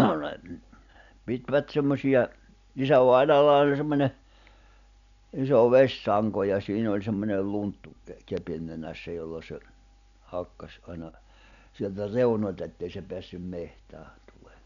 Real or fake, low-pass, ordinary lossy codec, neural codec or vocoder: real; 7.2 kHz; none; none